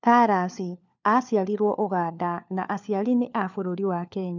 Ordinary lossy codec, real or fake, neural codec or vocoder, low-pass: none; fake; codec, 16 kHz, 4 kbps, FunCodec, trained on LibriTTS, 50 frames a second; 7.2 kHz